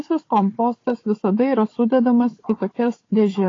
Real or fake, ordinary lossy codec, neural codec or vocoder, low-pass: fake; AAC, 32 kbps; codec, 16 kHz, 4 kbps, FunCodec, trained on Chinese and English, 50 frames a second; 7.2 kHz